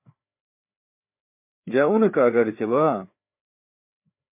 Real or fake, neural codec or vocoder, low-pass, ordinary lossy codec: fake; codec, 16 kHz, 4 kbps, FreqCodec, larger model; 3.6 kHz; MP3, 24 kbps